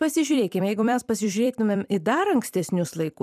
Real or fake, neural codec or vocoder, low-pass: fake; vocoder, 44.1 kHz, 128 mel bands every 256 samples, BigVGAN v2; 14.4 kHz